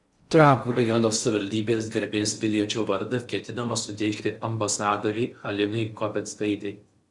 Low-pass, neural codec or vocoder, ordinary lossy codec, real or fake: 10.8 kHz; codec, 16 kHz in and 24 kHz out, 0.6 kbps, FocalCodec, streaming, 4096 codes; Opus, 64 kbps; fake